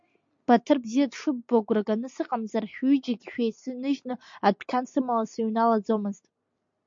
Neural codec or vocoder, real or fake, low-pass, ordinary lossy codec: none; real; 7.2 kHz; MP3, 48 kbps